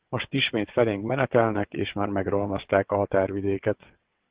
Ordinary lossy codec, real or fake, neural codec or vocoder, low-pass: Opus, 16 kbps; fake; vocoder, 22.05 kHz, 80 mel bands, WaveNeXt; 3.6 kHz